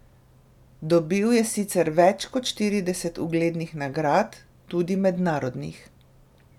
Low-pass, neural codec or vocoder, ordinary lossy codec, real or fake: 19.8 kHz; none; none; real